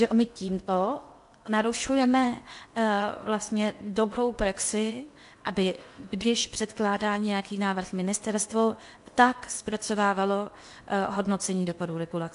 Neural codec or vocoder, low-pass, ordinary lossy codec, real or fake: codec, 16 kHz in and 24 kHz out, 0.8 kbps, FocalCodec, streaming, 65536 codes; 10.8 kHz; AAC, 64 kbps; fake